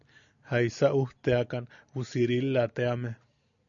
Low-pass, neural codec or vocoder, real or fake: 7.2 kHz; none; real